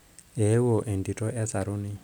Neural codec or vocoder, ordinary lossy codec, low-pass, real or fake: none; none; none; real